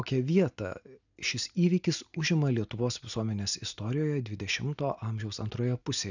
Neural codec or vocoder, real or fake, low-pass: none; real; 7.2 kHz